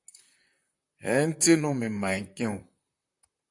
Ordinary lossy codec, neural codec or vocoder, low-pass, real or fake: AAC, 64 kbps; vocoder, 44.1 kHz, 128 mel bands, Pupu-Vocoder; 10.8 kHz; fake